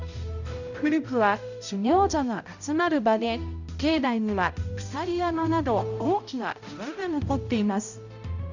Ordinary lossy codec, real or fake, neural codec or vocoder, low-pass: none; fake; codec, 16 kHz, 0.5 kbps, X-Codec, HuBERT features, trained on balanced general audio; 7.2 kHz